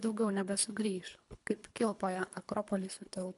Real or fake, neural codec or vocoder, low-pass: fake; codec, 24 kHz, 3 kbps, HILCodec; 10.8 kHz